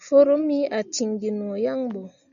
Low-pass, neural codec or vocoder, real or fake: 7.2 kHz; none; real